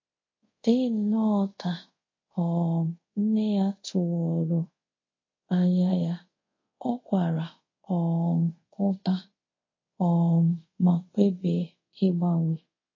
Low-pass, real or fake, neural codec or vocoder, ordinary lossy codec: 7.2 kHz; fake; codec, 24 kHz, 0.5 kbps, DualCodec; MP3, 32 kbps